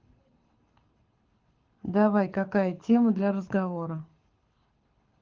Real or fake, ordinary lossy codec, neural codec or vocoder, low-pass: fake; Opus, 16 kbps; codec, 24 kHz, 6 kbps, HILCodec; 7.2 kHz